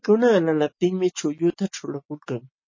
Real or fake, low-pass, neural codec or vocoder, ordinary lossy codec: real; 7.2 kHz; none; MP3, 32 kbps